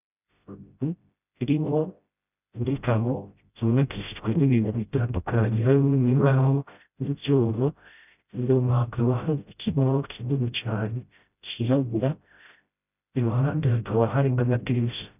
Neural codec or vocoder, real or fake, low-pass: codec, 16 kHz, 0.5 kbps, FreqCodec, smaller model; fake; 3.6 kHz